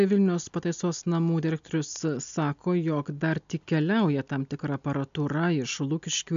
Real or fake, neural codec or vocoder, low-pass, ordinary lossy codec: real; none; 7.2 kHz; MP3, 64 kbps